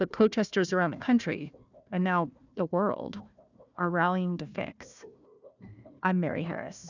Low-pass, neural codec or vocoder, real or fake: 7.2 kHz; codec, 16 kHz, 1 kbps, FunCodec, trained on Chinese and English, 50 frames a second; fake